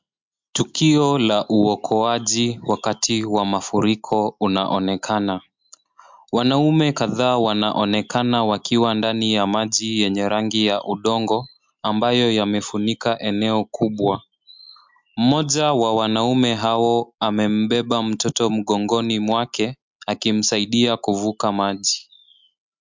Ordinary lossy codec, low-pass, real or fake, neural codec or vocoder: MP3, 64 kbps; 7.2 kHz; real; none